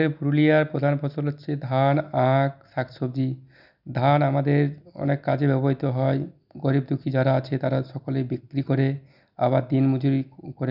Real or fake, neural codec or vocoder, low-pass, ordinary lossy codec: real; none; 5.4 kHz; none